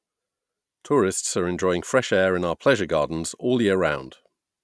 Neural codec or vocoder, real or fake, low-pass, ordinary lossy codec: none; real; none; none